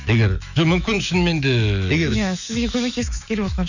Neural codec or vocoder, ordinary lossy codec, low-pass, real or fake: autoencoder, 48 kHz, 128 numbers a frame, DAC-VAE, trained on Japanese speech; none; 7.2 kHz; fake